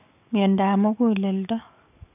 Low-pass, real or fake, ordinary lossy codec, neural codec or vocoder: 3.6 kHz; fake; none; codec, 44.1 kHz, 7.8 kbps, DAC